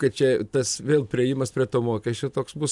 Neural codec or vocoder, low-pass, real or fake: none; 10.8 kHz; real